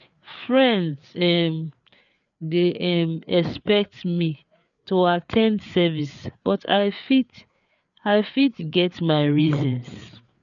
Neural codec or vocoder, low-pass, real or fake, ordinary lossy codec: codec, 16 kHz, 4 kbps, FreqCodec, larger model; 7.2 kHz; fake; none